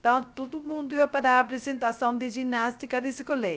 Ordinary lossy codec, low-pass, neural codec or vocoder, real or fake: none; none; codec, 16 kHz, 0.3 kbps, FocalCodec; fake